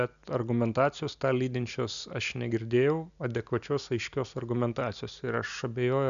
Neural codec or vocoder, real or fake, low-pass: none; real; 7.2 kHz